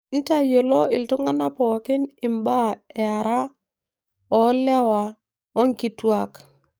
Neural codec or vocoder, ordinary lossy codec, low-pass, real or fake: codec, 44.1 kHz, 7.8 kbps, DAC; none; none; fake